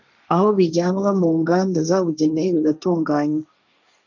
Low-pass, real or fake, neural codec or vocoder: 7.2 kHz; fake; codec, 16 kHz, 1.1 kbps, Voila-Tokenizer